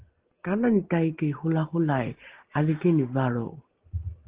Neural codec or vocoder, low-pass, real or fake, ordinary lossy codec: none; 3.6 kHz; real; Opus, 16 kbps